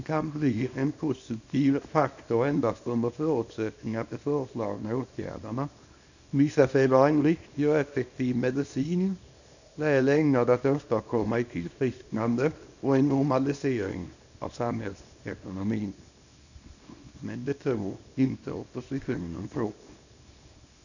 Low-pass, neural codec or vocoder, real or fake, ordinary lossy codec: 7.2 kHz; codec, 24 kHz, 0.9 kbps, WavTokenizer, small release; fake; none